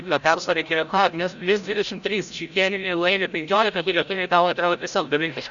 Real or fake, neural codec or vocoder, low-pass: fake; codec, 16 kHz, 0.5 kbps, FreqCodec, larger model; 7.2 kHz